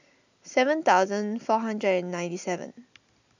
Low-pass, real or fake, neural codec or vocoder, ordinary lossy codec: 7.2 kHz; real; none; none